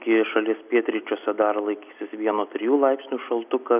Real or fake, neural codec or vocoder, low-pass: real; none; 3.6 kHz